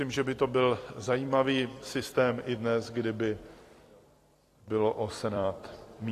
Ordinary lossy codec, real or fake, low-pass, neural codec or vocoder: AAC, 48 kbps; fake; 14.4 kHz; vocoder, 44.1 kHz, 128 mel bands every 256 samples, BigVGAN v2